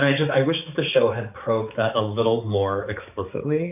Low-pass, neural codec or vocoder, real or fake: 3.6 kHz; codec, 16 kHz, 4 kbps, X-Codec, HuBERT features, trained on balanced general audio; fake